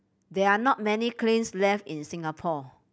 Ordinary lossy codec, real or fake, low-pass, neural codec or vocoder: none; real; none; none